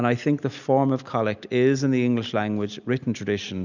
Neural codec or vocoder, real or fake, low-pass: none; real; 7.2 kHz